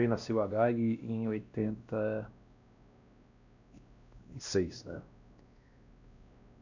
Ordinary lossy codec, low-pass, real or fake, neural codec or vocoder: none; 7.2 kHz; fake; codec, 16 kHz, 1 kbps, X-Codec, WavLM features, trained on Multilingual LibriSpeech